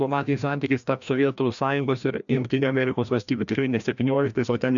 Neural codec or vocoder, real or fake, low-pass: codec, 16 kHz, 1 kbps, FreqCodec, larger model; fake; 7.2 kHz